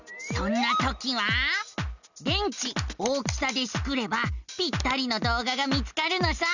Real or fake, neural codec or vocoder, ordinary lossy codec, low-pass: real; none; none; 7.2 kHz